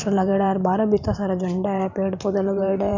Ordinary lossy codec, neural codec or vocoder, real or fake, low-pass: none; vocoder, 44.1 kHz, 128 mel bands every 512 samples, BigVGAN v2; fake; 7.2 kHz